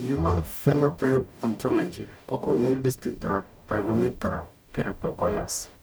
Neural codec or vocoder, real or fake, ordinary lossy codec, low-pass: codec, 44.1 kHz, 0.9 kbps, DAC; fake; none; none